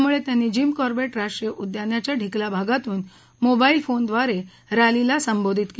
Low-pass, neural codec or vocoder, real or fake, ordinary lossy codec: none; none; real; none